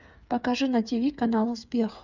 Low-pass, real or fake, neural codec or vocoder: 7.2 kHz; fake; codec, 16 kHz, 8 kbps, FreqCodec, smaller model